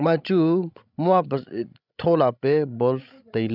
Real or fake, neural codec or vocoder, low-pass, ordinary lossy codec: fake; codec, 16 kHz, 16 kbps, FreqCodec, larger model; 5.4 kHz; none